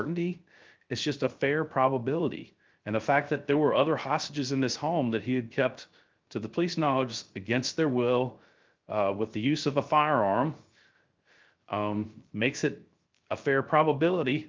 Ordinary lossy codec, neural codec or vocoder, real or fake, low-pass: Opus, 32 kbps; codec, 16 kHz, 0.3 kbps, FocalCodec; fake; 7.2 kHz